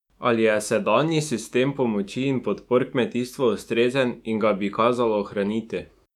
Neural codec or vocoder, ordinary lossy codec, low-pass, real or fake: autoencoder, 48 kHz, 128 numbers a frame, DAC-VAE, trained on Japanese speech; none; 19.8 kHz; fake